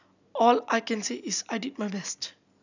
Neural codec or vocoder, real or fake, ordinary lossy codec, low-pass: none; real; none; 7.2 kHz